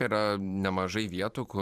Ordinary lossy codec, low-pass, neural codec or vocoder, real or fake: Opus, 64 kbps; 14.4 kHz; vocoder, 44.1 kHz, 128 mel bands every 512 samples, BigVGAN v2; fake